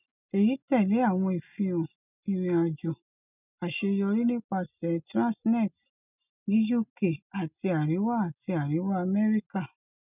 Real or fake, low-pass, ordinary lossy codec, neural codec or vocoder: real; 3.6 kHz; none; none